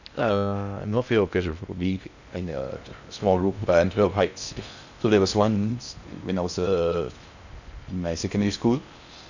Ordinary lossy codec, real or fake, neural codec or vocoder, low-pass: none; fake; codec, 16 kHz in and 24 kHz out, 0.6 kbps, FocalCodec, streaming, 2048 codes; 7.2 kHz